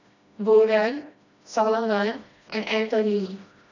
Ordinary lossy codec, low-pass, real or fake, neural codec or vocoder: none; 7.2 kHz; fake; codec, 16 kHz, 1 kbps, FreqCodec, smaller model